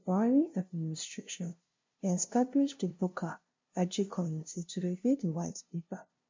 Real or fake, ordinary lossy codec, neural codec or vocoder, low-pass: fake; MP3, 48 kbps; codec, 16 kHz, 0.5 kbps, FunCodec, trained on LibriTTS, 25 frames a second; 7.2 kHz